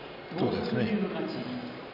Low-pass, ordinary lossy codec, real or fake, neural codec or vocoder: 5.4 kHz; none; fake; vocoder, 44.1 kHz, 128 mel bands every 256 samples, BigVGAN v2